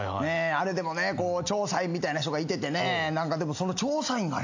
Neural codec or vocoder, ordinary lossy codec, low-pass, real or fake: none; AAC, 48 kbps; 7.2 kHz; real